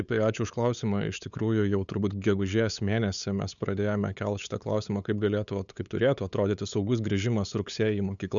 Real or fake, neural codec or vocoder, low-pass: fake; codec, 16 kHz, 8 kbps, FunCodec, trained on LibriTTS, 25 frames a second; 7.2 kHz